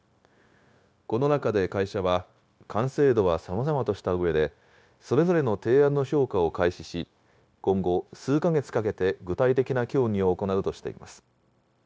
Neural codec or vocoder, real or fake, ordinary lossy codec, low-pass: codec, 16 kHz, 0.9 kbps, LongCat-Audio-Codec; fake; none; none